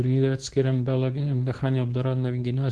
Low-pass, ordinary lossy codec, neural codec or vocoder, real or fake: 10.8 kHz; Opus, 16 kbps; codec, 24 kHz, 0.9 kbps, WavTokenizer, medium speech release version 2; fake